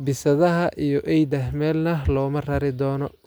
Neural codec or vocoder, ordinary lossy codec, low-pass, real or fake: none; none; none; real